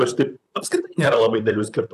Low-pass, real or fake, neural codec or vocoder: 14.4 kHz; fake; vocoder, 44.1 kHz, 128 mel bands, Pupu-Vocoder